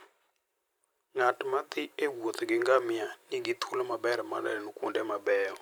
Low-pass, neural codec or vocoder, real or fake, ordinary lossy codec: none; vocoder, 44.1 kHz, 128 mel bands every 512 samples, BigVGAN v2; fake; none